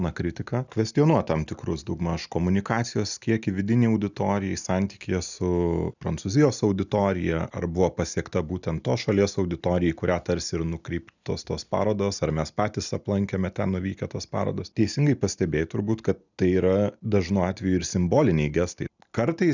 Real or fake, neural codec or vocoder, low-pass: real; none; 7.2 kHz